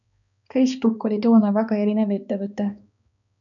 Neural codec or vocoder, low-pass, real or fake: codec, 16 kHz, 2 kbps, X-Codec, HuBERT features, trained on balanced general audio; 7.2 kHz; fake